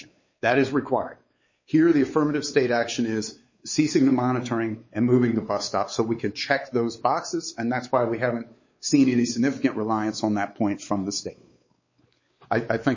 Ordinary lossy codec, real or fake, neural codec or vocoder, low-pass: MP3, 32 kbps; fake; codec, 16 kHz, 4 kbps, X-Codec, WavLM features, trained on Multilingual LibriSpeech; 7.2 kHz